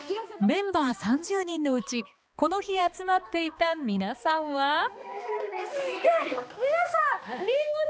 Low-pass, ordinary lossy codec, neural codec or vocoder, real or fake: none; none; codec, 16 kHz, 2 kbps, X-Codec, HuBERT features, trained on balanced general audio; fake